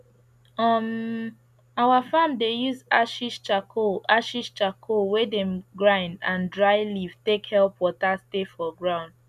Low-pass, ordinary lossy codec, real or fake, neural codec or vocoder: 14.4 kHz; none; real; none